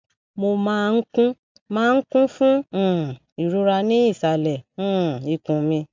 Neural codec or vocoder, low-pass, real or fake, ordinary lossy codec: none; 7.2 kHz; real; MP3, 64 kbps